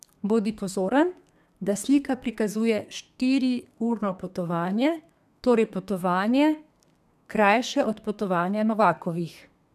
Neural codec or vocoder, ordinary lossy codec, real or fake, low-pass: codec, 32 kHz, 1.9 kbps, SNAC; none; fake; 14.4 kHz